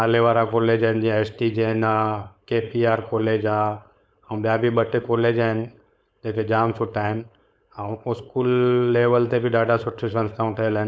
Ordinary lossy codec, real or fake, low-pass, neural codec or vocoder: none; fake; none; codec, 16 kHz, 4.8 kbps, FACodec